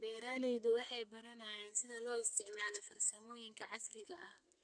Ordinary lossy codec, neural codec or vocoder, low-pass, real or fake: none; codec, 32 kHz, 1.9 kbps, SNAC; 9.9 kHz; fake